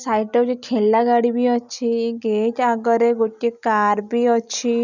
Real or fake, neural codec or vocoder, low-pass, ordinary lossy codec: real; none; 7.2 kHz; none